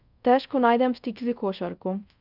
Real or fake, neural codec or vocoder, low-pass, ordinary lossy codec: fake; codec, 24 kHz, 0.5 kbps, DualCodec; 5.4 kHz; none